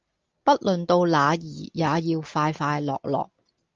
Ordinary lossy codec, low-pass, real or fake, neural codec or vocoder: Opus, 24 kbps; 7.2 kHz; real; none